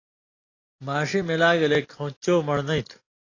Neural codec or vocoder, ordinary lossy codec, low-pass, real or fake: none; AAC, 32 kbps; 7.2 kHz; real